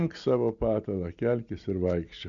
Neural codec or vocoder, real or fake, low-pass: none; real; 7.2 kHz